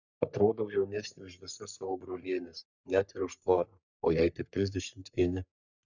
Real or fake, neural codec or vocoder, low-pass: fake; codec, 44.1 kHz, 3.4 kbps, Pupu-Codec; 7.2 kHz